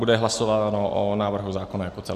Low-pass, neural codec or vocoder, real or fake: 14.4 kHz; none; real